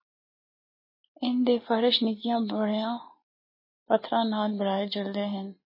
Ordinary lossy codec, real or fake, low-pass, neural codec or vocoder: MP3, 24 kbps; real; 5.4 kHz; none